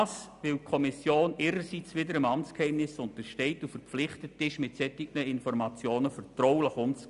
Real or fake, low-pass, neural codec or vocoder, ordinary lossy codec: real; 10.8 kHz; none; none